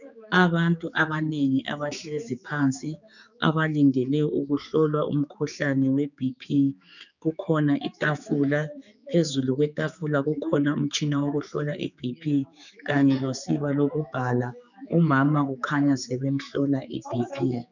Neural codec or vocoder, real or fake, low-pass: codec, 16 kHz, 4 kbps, X-Codec, HuBERT features, trained on general audio; fake; 7.2 kHz